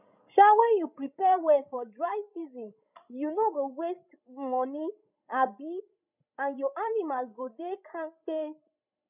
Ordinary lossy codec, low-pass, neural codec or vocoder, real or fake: none; 3.6 kHz; codec, 16 kHz, 16 kbps, FreqCodec, larger model; fake